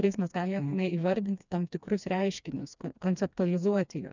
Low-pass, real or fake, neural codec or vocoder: 7.2 kHz; fake; codec, 16 kHz, 2 kbps, FreqCodec, smaller model